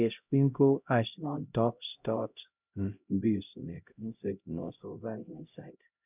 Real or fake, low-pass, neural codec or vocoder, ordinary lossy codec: fake; 3.6 kHz; codec, 16 kHz, 0.5 kbps, X-Codec, HuBERT features, trained on LibriSpeech; none